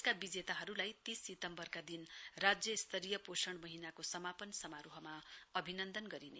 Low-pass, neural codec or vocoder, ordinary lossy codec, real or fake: none; none; none; real